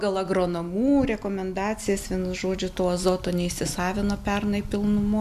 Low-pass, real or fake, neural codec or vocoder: 14.4 kHz; real; none